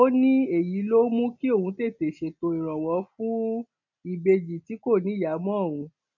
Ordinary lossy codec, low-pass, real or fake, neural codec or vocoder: none; 7.2 kHz; real; none